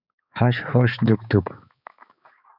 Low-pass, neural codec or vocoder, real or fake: 5.4 kHz; codec, 16 kHz, 4 kbps, X-Codec, HuBERT features, trained on balanced general audio; fake